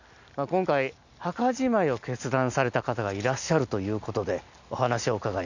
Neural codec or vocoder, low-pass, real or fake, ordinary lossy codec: none; 7.2 kHz; real; none